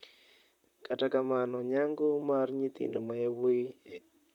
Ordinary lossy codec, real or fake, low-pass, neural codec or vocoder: MP3, 96 kbps; fake; 19.8 kHz; vocoder, 44.1 kHz, 128 mel bands, Pupu-Vocoder